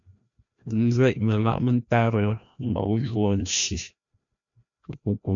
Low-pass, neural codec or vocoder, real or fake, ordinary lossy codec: 7.2 kHz; codec, 16 kHz, 1 kbps, FreqCodec, larger model; fake; MP3, 64 kbps